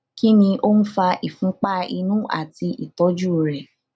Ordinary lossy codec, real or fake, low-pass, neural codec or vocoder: none; real; none; none